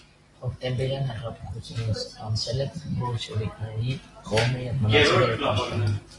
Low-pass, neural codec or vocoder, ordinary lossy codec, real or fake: 10.8 kHz; none; AAC, 32 kbps; real